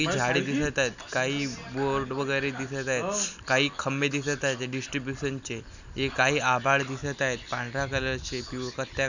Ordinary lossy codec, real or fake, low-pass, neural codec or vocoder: none; real; 7.2 kHz; none